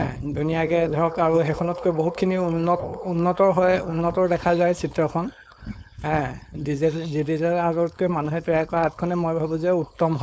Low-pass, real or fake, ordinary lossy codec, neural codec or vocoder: none; fake; none; codec, 16 kHz, 4.8 kbps, FACodec